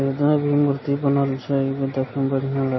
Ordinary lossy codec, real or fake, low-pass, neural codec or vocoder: MP3, 24 kbps; real; 7.2 kHz; none